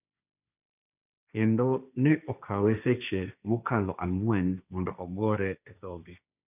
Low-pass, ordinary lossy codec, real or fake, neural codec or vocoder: 3.6 kHz; none; fake; codec, 16 kHz, 1.1 kbps, Voila-Tokenizer